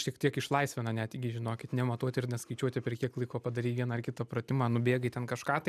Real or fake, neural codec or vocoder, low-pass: real; none; 14.4 kHz